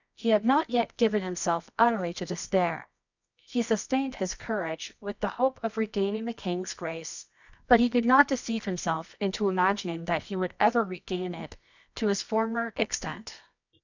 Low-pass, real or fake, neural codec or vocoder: 7.2 kHz; fake; codec, 24 kHz, 0.9 kbps, WavTokenizer, medium music audio release